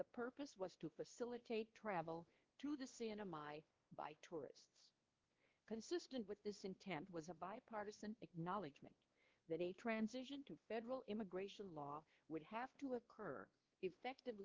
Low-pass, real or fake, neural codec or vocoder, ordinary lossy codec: 7.2 kHz; fake; codec, 16 kHz, 2 kbps, X-Codec, WavLM features, trained on Multilingual LibriSpeech; Opus, 16 kbps